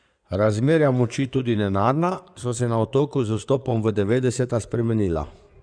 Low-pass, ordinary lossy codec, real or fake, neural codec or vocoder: 9.9 kHz; none; fake; codec, 16 kHz in and 24 kHz out, 2.2 kbps, FireRedTTS-2 codec